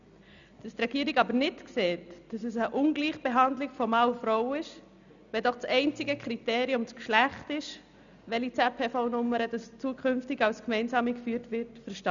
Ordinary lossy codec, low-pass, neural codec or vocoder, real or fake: none; 7.2 kHz; none; real